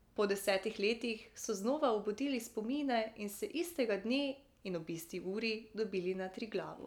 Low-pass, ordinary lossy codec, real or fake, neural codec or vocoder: 19.8 kHz; none; real; none